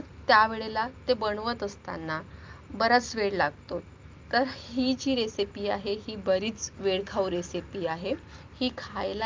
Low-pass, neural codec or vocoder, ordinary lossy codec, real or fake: 7.2 kHz; none; Opus, 24 kbps; real